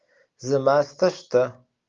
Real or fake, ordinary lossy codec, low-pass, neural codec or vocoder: real; Opus, 32 kbps; 7.2 kHz; none